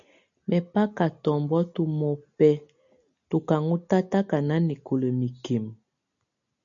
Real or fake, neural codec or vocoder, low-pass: real; none; 7.2 kHz